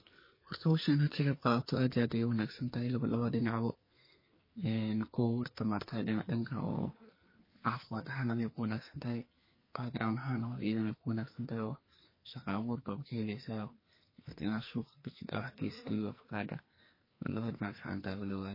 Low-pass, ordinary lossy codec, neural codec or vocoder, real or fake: 5.4 kHz; MP3, 24 kbps; codec, 44.1 kHz, 2.6 kbps, SNAC; fake